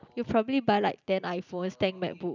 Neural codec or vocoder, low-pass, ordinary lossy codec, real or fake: none; 7.2 kHz; none; real